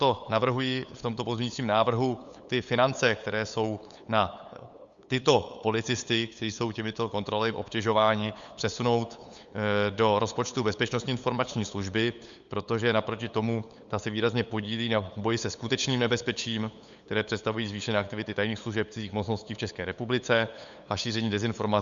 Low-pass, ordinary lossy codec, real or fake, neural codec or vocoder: 7.2 kHz; Opus, 64 kbps; fake; codec, 16 kHz, 8 kbps, FunCodec, trained on LibriTTS, 25 frames a second